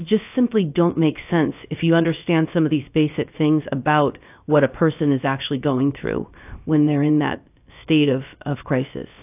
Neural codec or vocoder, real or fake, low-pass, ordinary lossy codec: codec, 16 kHz, about 1 kbps, DyCAST, with the encoder's durations; fake; 3.6 kHz; AAC, 32 kbps